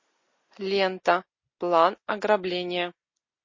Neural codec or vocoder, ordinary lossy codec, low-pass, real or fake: none; MP3, 32 kbps; 7.2 kHz; real